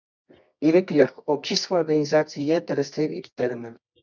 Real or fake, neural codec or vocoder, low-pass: fake; codec, 24 kHz, 0.9 kbps, WavTokenizer, medium music audio release; 7.2 kHz